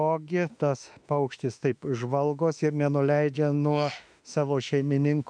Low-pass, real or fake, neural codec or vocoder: 9.9 kHz; fake; autoencoder, 48 kHz, 32 numbers a frame, DAC-VAE, trained on Japanese speech